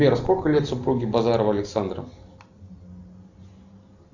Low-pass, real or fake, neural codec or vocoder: 7.2 kHz; real; none